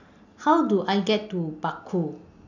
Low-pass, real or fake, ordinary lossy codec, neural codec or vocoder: 7.2 kHz; real; none; none